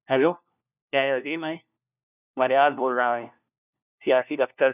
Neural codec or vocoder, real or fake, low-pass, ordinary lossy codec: codec, 16 kHz, 1 kbps, FunCodec, trained on LibriTTS, 50 frames a second; fake; 3.6 kHz; none